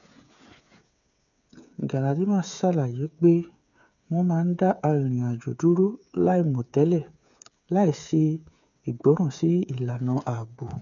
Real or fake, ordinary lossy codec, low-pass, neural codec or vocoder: fake; MP3, 96 kbps; 7.2 kHz; codec, 16 kHz, 8 kbps, FreqCodec, smaller model